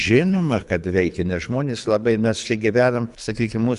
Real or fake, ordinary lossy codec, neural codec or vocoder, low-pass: fake; AAC, 96 kbps; codec, 24 kHz, 3 kbps, HILCodec; 10.8 kHz